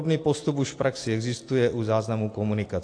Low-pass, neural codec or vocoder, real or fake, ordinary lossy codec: 9.9 kHz; none; real; AAC, 48 kbps